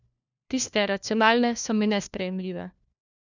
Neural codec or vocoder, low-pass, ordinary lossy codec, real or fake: codec, 16 kHz, 1 kbps, FunCodec, trained on LibriTTS, 50 frames a second; 7.2 kHz; none; fake